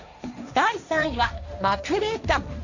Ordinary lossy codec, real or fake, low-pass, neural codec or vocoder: none; fake; 7.2 kHz; codec, 16 kHz, 1.1 kbps, Voila-Tokenizer